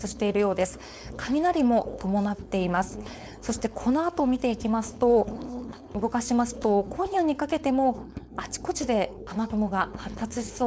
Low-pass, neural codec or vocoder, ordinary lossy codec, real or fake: none; codec, 16 kHz, 4.8 kbps, FACodec; none; fake